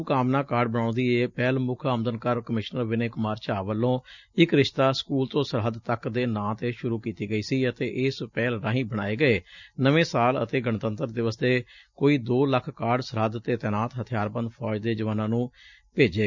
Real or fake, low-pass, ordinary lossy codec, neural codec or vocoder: real; 7.2 kHz; none; none